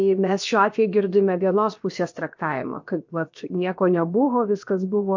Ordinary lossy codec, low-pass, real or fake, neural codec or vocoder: MP3, 48 kbps; 7.2 kHz; fake; codec, 16 kHz, 0.7 kbps, FocalCodec